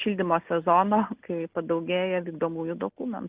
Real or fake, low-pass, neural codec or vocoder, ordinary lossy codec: real; 3.6 kHz; none; Opus, 32 kbps